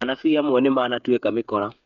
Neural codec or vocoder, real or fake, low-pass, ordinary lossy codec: codec, 16 kHz, 8 kbps, FreqCodec, smaller model; fake; 7.2 kHz; none